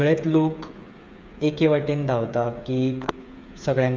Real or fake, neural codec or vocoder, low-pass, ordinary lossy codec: fake; codec, 16 kHz, 8 kbps, FreqCodec, smaller model; none; none